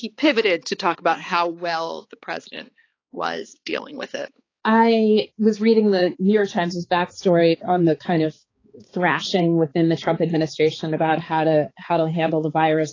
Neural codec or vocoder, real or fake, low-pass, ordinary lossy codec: codec, 16 kHz, 4 kbps, X-Codec, HuBERT features, trained on balanced general audio; fake; 7.2 kHz; AAC, 32 kbps